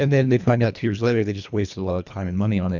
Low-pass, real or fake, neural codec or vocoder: 7.2 kHz; fake; codec, 24 kHz, 1.5 kbps, HILCodec